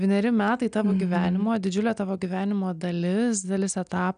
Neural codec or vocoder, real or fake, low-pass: none; real; 9.9 kHz